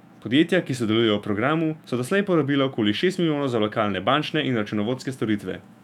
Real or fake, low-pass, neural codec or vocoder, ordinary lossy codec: fake; 19.8 kHz; autoencoder, 48 kHz, 128 numbers a frame, DAC-VAE, trained on Japanese speech; none